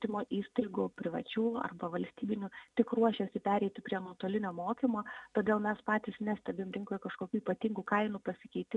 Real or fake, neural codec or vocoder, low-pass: real; none; 10.8 kHz